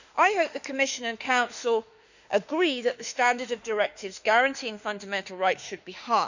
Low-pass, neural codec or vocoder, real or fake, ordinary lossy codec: 7.2 kHz; autoencoder, 48 kHz, 32 numbers a frame, DAC-VAE, trained on Japanese speech; fake; none